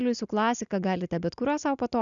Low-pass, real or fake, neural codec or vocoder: 7.2 kHz; real; none